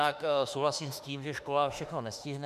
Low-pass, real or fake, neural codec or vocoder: 14.4 kHz; fake; autoencoder, 48 kHz, 32 numbers a frame, DAC-VAE, trained on Japanese speech